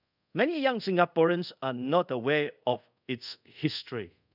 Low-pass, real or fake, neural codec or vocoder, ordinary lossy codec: 5.4 kHz; fake; codec, 24 kHz, 0.5 kbps, DualCodec; none